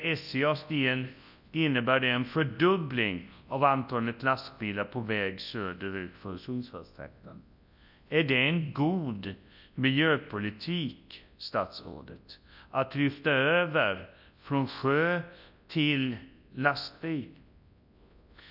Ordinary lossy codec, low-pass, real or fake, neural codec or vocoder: none; 5.4 kHz; fake; codec, 24 kHz, 0.9 kbps, WavTokenizer, large speech release